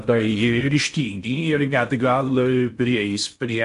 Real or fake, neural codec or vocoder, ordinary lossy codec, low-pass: fake; codec, 16 kHz in and 24 kHz out, 0.6 kbps, FocalCodec, streaming, 4096 codes; MP3, 64 kbps; 10.8 kHz